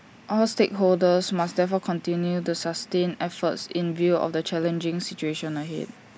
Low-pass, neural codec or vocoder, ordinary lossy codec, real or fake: none; none; none; real